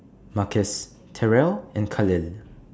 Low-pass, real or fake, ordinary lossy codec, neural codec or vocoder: none; real; none; none